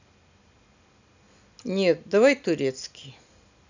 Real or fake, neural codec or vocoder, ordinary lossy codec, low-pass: real; none; none; 7.2 kHz